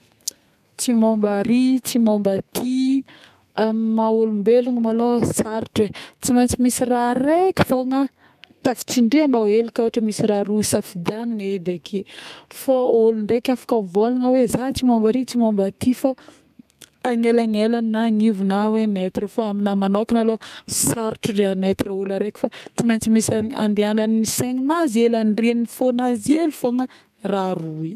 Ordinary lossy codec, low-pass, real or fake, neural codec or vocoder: none; 14.4 kHz; fake; codec, 32 kHz, 1.9 kbps, SNAC